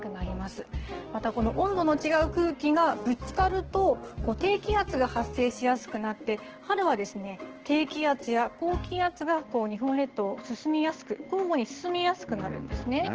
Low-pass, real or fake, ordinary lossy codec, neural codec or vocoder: 7.2 kHz; fake; Opus, 16 kbps; codec, 16 kHz, 6 kbps, DAC